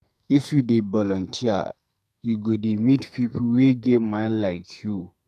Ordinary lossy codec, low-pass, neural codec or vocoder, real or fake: none; 14.4 kHz; codec, 44.1 kHz, 2.6 kbps, SNAC; fake